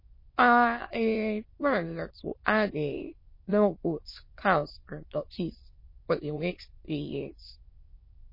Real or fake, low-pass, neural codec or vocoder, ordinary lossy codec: fake; 5.4 kHz; autoencoder, 22.05 kHz, a latent of 192 numbers a frame, VITS, trained on many speakers; MP3, 24 kbps